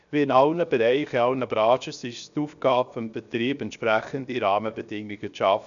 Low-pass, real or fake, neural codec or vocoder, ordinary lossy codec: 7.2 kHz; fake; codec, 16 kHz, 0.7 kbps, FocalCodec; none